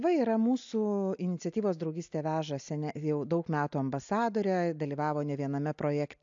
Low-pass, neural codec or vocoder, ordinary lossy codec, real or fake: 7.2 kHz; none; AAC, 64 kbps; real